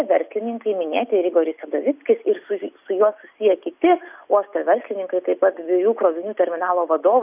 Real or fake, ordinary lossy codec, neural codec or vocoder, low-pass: real; AAC, 32 kbps; none; 3.6 kHz